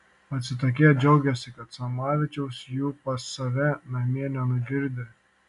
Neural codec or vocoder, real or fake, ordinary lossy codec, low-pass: none; real; MP3, 96 kbps; 10.8 kHz